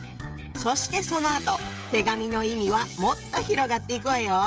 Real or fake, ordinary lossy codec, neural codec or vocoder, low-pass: fake; none; codec, 16 kHz, 16 kbps, FreqCodec, smaller model; none